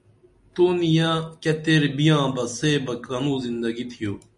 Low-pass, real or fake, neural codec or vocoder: 10.8 kHz; real; none